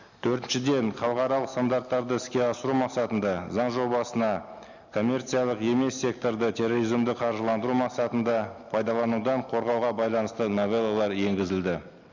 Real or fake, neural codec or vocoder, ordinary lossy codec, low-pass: real; none; none; 7.2 kHz